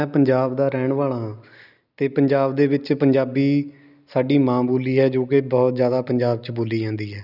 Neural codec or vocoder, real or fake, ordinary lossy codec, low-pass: none; real; none; 5.4 kHz